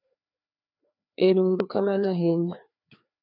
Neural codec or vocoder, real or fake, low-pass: codec, 16 kHz, 2 kbps, FreqCodec, larger model; fake; 5.4 kHz